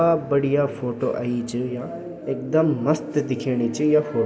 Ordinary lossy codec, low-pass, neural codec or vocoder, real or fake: none; none; none; real